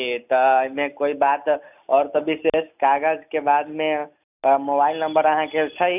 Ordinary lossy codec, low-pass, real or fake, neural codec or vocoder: none; 3.6 kHz; real; none